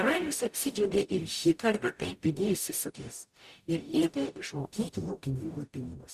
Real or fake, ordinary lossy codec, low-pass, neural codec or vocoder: fake; Opus, 64 kbps; 14.4 kHz; codec, 44.1 kHz, 0.9 kbps, DAC